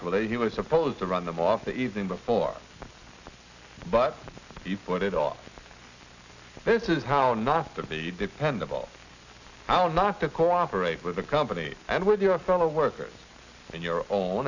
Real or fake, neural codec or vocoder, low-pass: real; none; 7.2 kHz